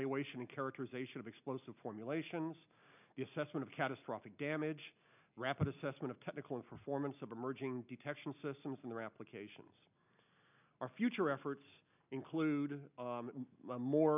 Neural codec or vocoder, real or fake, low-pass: none; real; 3.6 kHz